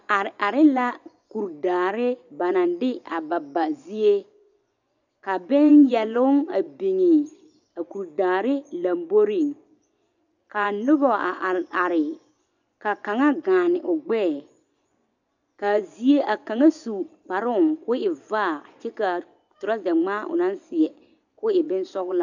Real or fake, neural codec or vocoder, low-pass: fake; vocoder, 24 kHz, 100 mel bands, Vocos; 7.2 kHz